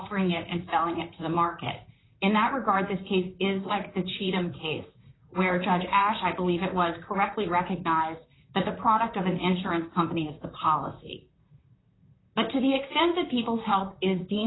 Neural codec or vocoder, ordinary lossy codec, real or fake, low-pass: vocoder, 44.1 kHz, 128 mel bands, Pupu-Vocoder; AAC, 16 kbps; fake; 7.2 kHz